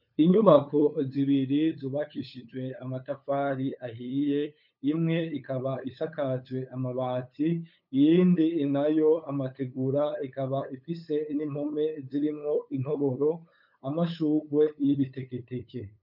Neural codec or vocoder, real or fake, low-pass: codec, 16 kHz, 8 kbps, FunCodec, trained on LibriTTS, 25 frames a second; fake; 5.4 kHz